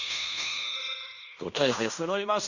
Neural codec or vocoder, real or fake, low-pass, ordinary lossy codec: codec, 16 kHz in and 24 kHz out, 0.9 kbps, LongCat-Audio-Codec, fine tuned four codebook decoder; fake; 7.2 kHz; none